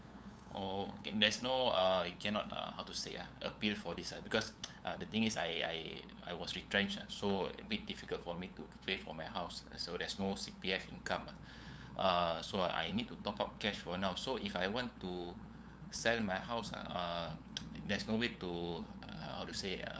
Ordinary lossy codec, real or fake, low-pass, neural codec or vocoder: none; fake; none; codec, 16 kHz, 8 kbps, FunCodec, trained on LibriTTS, 25 frames a second